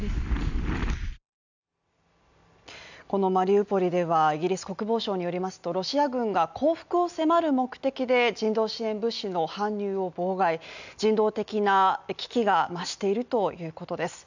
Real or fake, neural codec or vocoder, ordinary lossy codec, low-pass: real; none; none; 7.2 kHz